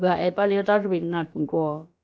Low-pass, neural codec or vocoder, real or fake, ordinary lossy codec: none; codec, 16 kHz, about 1 kbps, DyCAST, with the encoder's durations; fake; none